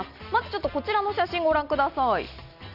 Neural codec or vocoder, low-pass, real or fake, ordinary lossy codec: none; 5.4 kHz; real; none